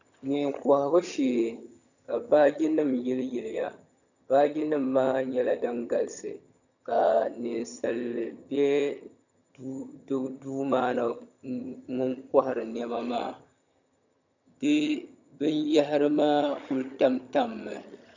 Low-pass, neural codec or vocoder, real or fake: 7.2 kHz; vocoder, 22.05 kHz, 80 mel bands, HiFi-GAN; fake